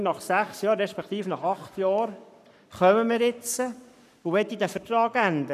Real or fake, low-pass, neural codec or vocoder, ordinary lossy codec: fake; 14.4 kHz; codec, 44.1 kHz, 7.8 kbps, Pupu-Codec; none